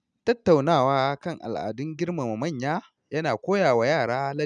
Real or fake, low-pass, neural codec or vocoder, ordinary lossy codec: real; 9.9 kHz; none; none